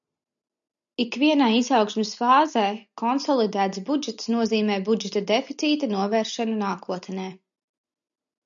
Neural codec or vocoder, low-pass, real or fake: none; 7.2 kHz; real